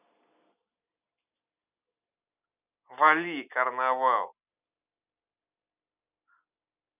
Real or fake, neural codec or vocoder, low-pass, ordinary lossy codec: real; none; 3.6 kHz; none